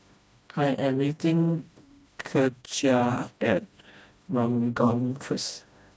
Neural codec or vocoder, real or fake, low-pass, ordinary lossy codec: codec, 16 kHz, 1 kbps, FreqCodec, smaller model; fake; none; none